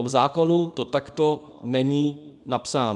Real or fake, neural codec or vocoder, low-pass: fake; codec, 24 kHz, 0.9 kbps, WavTokenizer, small release; 10.8 kHz